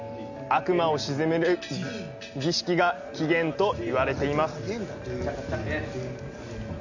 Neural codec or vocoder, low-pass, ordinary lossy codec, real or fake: none; 7.2 kHz; none; real